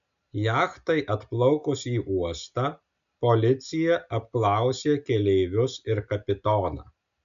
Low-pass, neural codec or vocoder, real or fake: 7.2 kHz; none; real